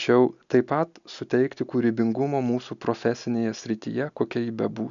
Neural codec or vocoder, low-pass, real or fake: none; 7.2 kHz; real